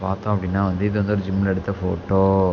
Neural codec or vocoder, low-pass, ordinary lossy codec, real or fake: none; 7.2 kHz; none; real